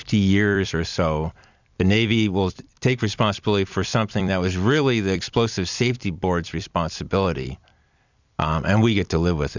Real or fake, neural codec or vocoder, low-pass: fake; vocoder, 44.1 kHz, 128 mel bands every 256 samples, BigVGAN v2; 7.2 kHz